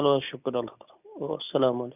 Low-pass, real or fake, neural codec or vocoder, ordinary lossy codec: 3.6 kHz; real; none; none